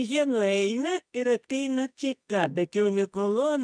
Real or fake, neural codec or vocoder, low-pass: fake; codec, 24 kHz, 0.9 kbps, WavTokenizer, medium music audio release; 9.9 kHz